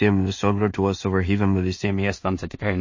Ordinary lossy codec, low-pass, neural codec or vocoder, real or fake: MP3, 32 kbps; 7.2 kHz; codec, 16 kHz in and 24 kHz out, 0.4 kbps, LongCat-Audio-Codec, two codebook decoder; fake